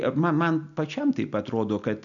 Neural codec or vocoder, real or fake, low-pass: none; real; 7.2 kHz